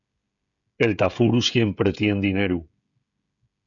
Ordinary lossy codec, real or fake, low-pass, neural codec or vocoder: MP3, 96 kbps; fake; 7.2 kHz; codec, 16 kHz, 16 kbps, FreqCodec, smaller model